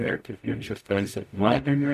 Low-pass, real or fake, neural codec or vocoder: 14.4 kHz; fake; codec, 44.1 kHz, 0.9 kbps, DAC